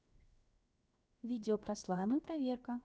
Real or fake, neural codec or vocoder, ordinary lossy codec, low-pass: fake; codec, 16 kHz, 0.7 kbps, FocalCodec; none; none